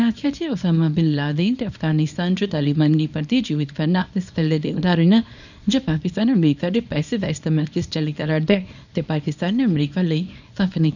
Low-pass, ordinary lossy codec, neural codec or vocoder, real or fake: 7.2 kHz; none; codec, 24 kHz, 0.9 kbps, WavTokenizer, small release; fake